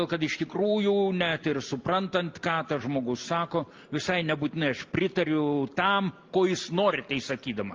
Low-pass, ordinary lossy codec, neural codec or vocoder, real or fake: 7.2 kHz; Opus, 24 kbps; none; real